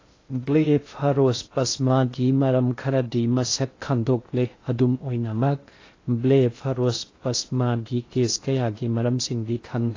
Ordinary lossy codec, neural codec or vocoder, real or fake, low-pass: AAC, 32 kbps; codec, 16 kHz in and 24 kHz out, 0.6 kbps, FocalCodec, streaming, 2048 codes; fake; 7.2 kHz